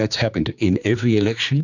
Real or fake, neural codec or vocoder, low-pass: fake; codec, 16 kHz, 2 kbps, X-Codec, HuBERT features, trained on general audio; 7.2 kHz